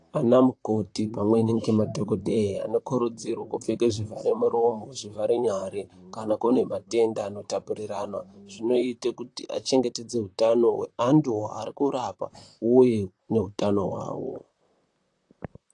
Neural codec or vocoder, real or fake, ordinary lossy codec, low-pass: vocoder, 44.1 kHz, 128 mel bands, Pupu-Vocoder; fake; AAC, 48 kbps; 10.8 kHz